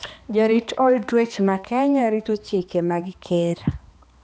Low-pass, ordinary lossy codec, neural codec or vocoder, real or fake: none; none; codec, 16 kHz, 2 kbps, X-Codec, HuBERT features, trained on balanced general audio; fake